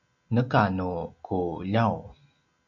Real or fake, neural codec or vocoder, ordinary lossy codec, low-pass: real; none; MP3, 64 kbps; 7.2 kHz